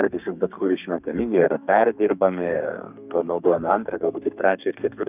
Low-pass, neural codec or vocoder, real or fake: 3.6 kHz; codec, 32 kHz, 1.9 kbps, SNAC; fake